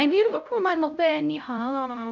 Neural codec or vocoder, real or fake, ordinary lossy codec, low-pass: codec, 16 kHz, 0.5 kbps, X-Codec, HuBERT features, trained on LibriSpeech; fake; none; 7.2 kHz